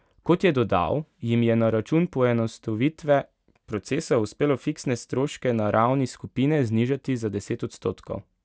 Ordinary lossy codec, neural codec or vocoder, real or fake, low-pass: none; none; real; none